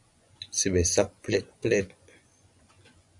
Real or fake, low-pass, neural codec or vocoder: fake; 10.8 kHz; vocoder, 24 kHz, 100 mel bands, Vocos